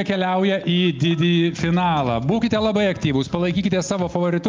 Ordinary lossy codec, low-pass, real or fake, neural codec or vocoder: Opus, 32 kbps; 7.2 kHz; real; none